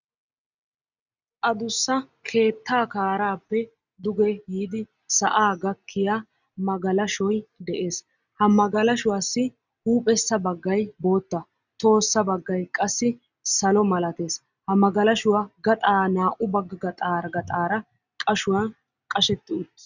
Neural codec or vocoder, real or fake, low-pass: none; real; 7.2 kHz